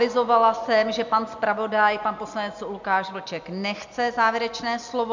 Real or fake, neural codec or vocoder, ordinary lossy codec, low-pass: real; none; MP3, 64 kbps; 7.2 kHz